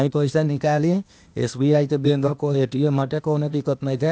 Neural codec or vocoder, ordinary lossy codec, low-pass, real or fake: codec, 16 kHz, 0.8 kbps, ZipCodec; none; none; fake